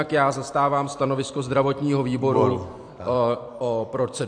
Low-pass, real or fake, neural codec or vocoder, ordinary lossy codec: 9.9 kHz; fake; vocoder, 44.1 kHz, 128 mel bands every 256 samples, BigVGAN v2; AAC, 64 kbps